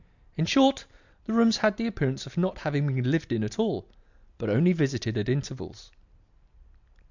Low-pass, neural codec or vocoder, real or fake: 7.2 kHz; none; real